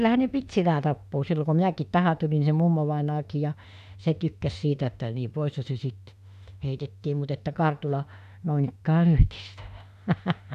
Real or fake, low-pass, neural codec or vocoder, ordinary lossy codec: fake; 14.4 kHz; autoencoder, 48 kHz, 32 numbers a frame, DAC-VAE, trained on Japanese speech; none